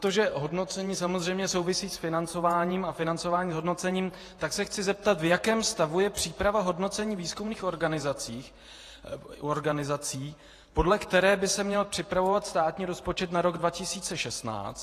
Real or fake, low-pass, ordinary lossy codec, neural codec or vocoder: fake; 14.4 kHz; AAC, 48 kbps; vocoder, 48 kHz, 128 mel bands, Vocos